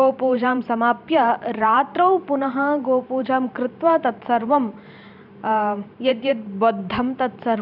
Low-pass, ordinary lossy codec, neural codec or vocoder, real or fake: 5.4 kHz; none; vocoder, 44.1 kHz, 128 mel bands every 512 samples, BigVGAN v2; fake